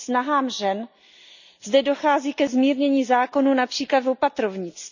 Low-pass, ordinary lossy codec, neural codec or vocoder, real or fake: 7.2 kHz; none; none; real